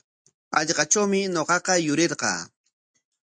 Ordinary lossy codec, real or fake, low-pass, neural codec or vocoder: MP3, 64 kbps; real; 9.9 kHz; none